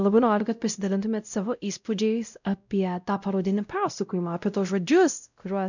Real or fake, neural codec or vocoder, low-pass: fake; codec, 16 kHz, 0.5 kbps, X-Codec, WavLM features, trained on Multilingual LibriSpeech; 7.2 kHz